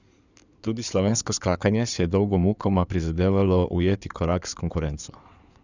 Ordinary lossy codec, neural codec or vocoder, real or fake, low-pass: none; codec, 16 kHz in and 24 kHz out, 2.2 kbps, FireRedTTS-2 codec; fake; 7.2 kHz